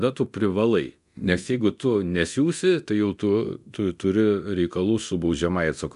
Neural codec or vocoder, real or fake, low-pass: codec, 24 kHz, 0.9 kbps, DualCodec; fake; 10.8 kHz